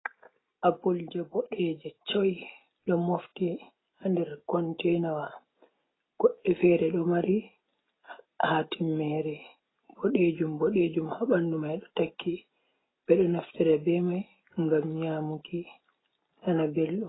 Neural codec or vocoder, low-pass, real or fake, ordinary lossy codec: none; 7.2 kHz; real; AAC, 16 kbps